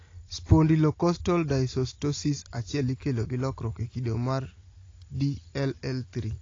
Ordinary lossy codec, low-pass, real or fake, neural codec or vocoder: AAC, 32 kbps; 7.2 kHz; real; none